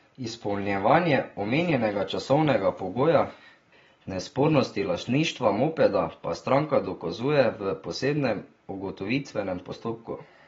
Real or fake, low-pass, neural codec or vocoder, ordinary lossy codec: real; 7.2 kHz; none; AAC, 24 kbps